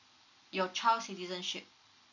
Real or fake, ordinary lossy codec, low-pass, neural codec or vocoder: real; none; 7.2 kHz; none